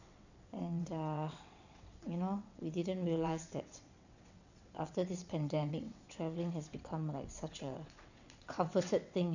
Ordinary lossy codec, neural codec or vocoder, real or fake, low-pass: none; vocoder, 22.05 kHz, 80 mel bands, WaveNeXt; fake; 7.2 kHz